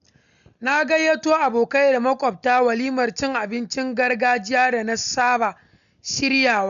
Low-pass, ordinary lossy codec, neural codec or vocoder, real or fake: 7.2 kHz; none; none; real